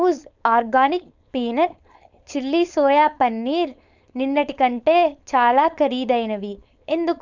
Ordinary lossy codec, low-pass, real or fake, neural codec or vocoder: none; 7.2 kHz; fake; codec, 16 kHz, 4.8 kbps, FACodec